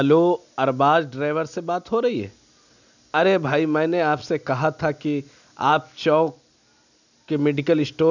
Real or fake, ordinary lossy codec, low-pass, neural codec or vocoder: real; none; 7.2 kHz; none